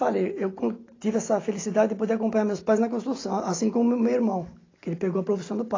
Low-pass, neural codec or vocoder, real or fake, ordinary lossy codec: 7.2 kHz; none; real; AAC, 32 kbps